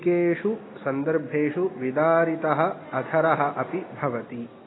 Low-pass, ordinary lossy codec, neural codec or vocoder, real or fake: 7.2 kHz; AAC, 16 kbps; none; real